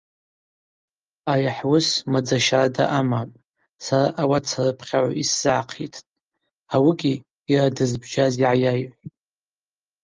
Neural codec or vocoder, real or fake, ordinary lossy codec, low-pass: none; real; Opus, 24 kbps; 7.2 kHz